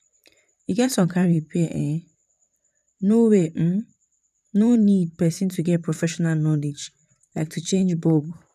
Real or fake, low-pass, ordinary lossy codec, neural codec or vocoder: fake; 14.4 kHz; none; vocoder, 44.1 kHz, 128 mel bands, Pupu-Vocoder